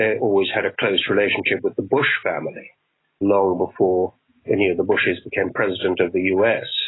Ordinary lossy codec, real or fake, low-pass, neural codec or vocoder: AAC, 16 kbps; real; 7.2 kHz; none